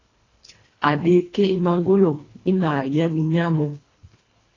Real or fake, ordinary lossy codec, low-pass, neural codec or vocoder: fake; AAC, 32 kbps; 7.2 kHz; codec, 24 kHz, 1.5 kbps, HILCodec